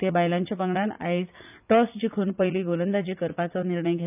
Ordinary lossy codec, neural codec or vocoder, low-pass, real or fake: none; vocoder, 44.1 kHz, 80 mel bands, Vocos; 3.6 kHz; fake